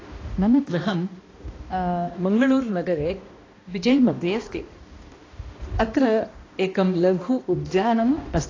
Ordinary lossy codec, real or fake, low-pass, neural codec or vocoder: AAC, 32 kbps; fake; 7.2 kHz; codec, 16 kHz, 1 kbps, X-Codec, HuBERT features, trained on balanced general audio